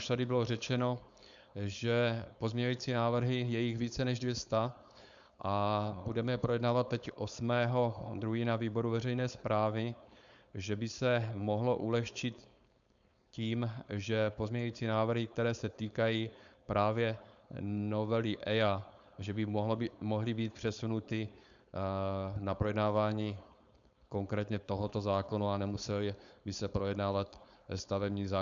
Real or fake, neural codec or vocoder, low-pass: fake; codec, 16 kHz, 4.8 kbps, FACodec; 7.2 kHz